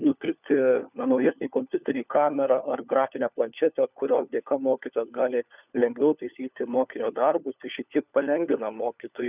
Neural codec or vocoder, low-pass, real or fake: codec, 16 kHz, 4 kbps, FunCodec, trained on LibriTTS, 50 frames a second; 3.6 kHz; fake